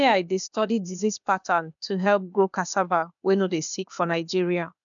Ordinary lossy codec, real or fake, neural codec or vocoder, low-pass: none; fake; codec, 16 kHz, 0.8 kbps, ZipCodec; 7.2 kHz